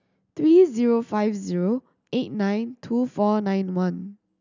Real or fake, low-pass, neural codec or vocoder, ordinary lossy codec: real; 7.2 kHz; none; none